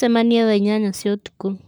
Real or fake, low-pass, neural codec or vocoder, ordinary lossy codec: fake; none; codec, 44.1 kHz, 7.8 kbps, Pupu-Codec; none